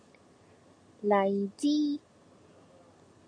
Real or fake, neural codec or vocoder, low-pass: real; none; 9.9 kHz